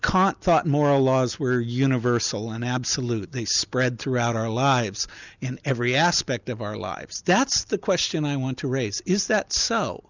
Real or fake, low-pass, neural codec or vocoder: real; 7.2 kHz; none